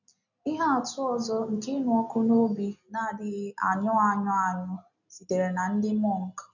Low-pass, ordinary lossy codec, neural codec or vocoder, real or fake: 7.2 kHz; none; none; real